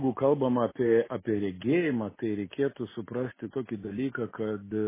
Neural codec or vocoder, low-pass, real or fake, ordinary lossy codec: none; 3.6 kHz; real; MP3, 16 kbps